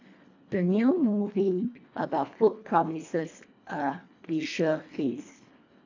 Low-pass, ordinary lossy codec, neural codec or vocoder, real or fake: 7.2 kHz; AAC, 48 kbps; codec, 24 kHz, 1.5 kbps, HILCodec; fake